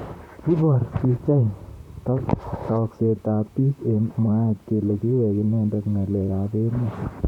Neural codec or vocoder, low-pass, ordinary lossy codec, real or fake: vocoder, 44.1 kHz, 128 mel bands, Pupu-Vocoder; 19.8 kHz; none; fake